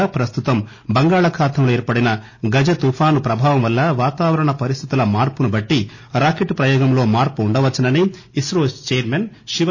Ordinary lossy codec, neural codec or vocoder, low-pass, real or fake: none; none; 7.2 kHz; real